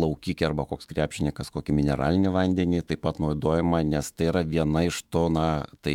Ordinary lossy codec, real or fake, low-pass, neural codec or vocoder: MP3, 96 kbps; fake; 19.8 kHz; autoencoder, 48 kHz, 128 numbers a frame, DAC-VAE, trained on Japanese speech